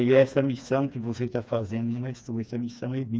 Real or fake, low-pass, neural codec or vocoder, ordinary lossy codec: fake; none; codec, 16 kHz, 2 kbps, FreqCodec, smaller model; none